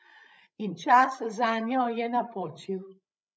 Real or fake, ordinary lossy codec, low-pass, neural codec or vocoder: fake; none; none; codec, 16 kHz, 16 kbps, FreqCodec, larger model